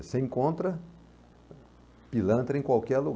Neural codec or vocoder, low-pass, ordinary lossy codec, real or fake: none; none; none; real